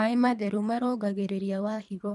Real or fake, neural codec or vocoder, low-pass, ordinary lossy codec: fake; codec, 24 kHz, 3 kbps, HILCodec; none; none